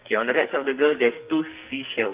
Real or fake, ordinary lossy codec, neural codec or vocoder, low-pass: fake; Opus, 32 kbps; codec, 44.1 kHz, 2.6 kbps, SNAC; 3.6 kHz